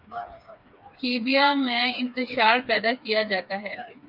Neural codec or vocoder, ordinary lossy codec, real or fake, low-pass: codec, 16 kHz, 4 kbps, FreqCodec, smaller model; MP3, 48 kbps; fake; 5.4 kHz